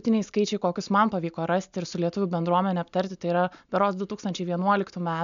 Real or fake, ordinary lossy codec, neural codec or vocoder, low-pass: real; MP3, 96 kbps; none; 7.2 kHz